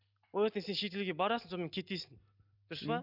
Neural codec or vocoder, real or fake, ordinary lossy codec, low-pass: none; real; none; 5.4 kHz